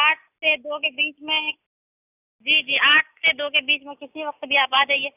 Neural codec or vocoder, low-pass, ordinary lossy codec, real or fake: none; 3.6 kHz; none; real